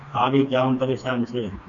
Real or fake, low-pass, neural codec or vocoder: fake; 7.2 kHz; codec, 16 kHz, 2 kbps, FreqCodec, smaller model